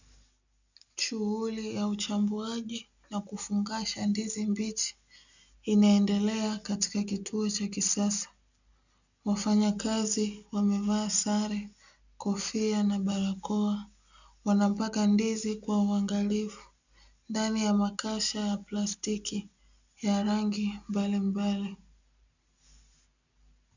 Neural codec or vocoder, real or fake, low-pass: none; real; 7.2 kHz